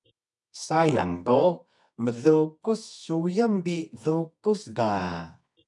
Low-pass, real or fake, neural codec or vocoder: 10.8 kHz; fake; codec, 24 kHz, 0.9 kbps, WavTokenizer, medium music audio release